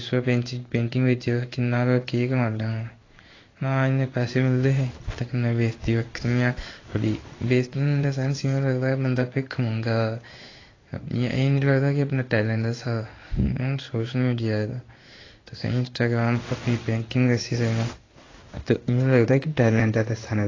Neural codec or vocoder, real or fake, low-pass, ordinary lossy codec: codec, 16 kHz in and 24 kHz out, 1 kbps, XY-Tokenizer; fake; 7.2 kHz; AAC, 32 kbps